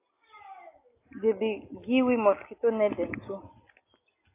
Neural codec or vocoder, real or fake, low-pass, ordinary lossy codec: none; real; 3.6 kHz; AAC, 24 kbps